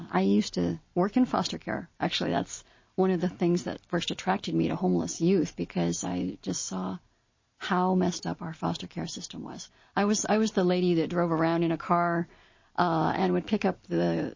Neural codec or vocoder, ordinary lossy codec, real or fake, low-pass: none; MP3, 32 kbps; real; 7.2 kHz